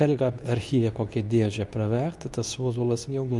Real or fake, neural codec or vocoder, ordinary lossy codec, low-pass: fake; codec, 24 kHz, 0.9 kbps, WavTokenizer, medium speech release version 2; MP3, 96 kbps; 10.8 kHz